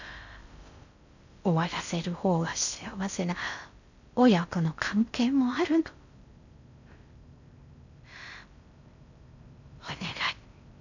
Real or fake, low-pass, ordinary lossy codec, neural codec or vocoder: fake; 7.2 kHz; none; codec, 16 kHz in and 24 kHz out, 0.6 kbps, FocalCodec, streaming, 2048 codes